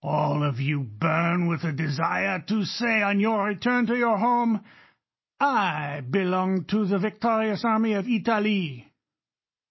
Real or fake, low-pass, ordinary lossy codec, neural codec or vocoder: real; 7.2 kHz; MP3, 24 kbps; none